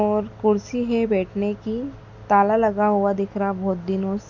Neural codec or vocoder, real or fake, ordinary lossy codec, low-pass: none; real; none; 7.2 kHz